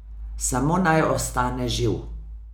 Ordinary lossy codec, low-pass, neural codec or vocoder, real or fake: none; none; none; real